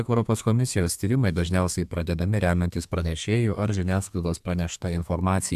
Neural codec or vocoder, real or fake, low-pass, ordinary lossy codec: codec, 32 kHz, 1.9 kbps, SNAC; fake; 14.4 kHz; AAC, 96 kbps